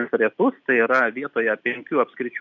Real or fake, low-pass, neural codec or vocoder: real; 7.2 kHz; none